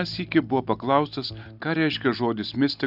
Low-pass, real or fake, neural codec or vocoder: 5.4 kHz; real; none